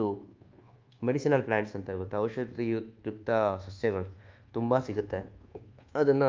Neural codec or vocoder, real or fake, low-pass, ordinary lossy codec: codec, 24 kHz, 1.2 kbps, DualCodec; fake; 7.2 kHz; Opus, 24 kbps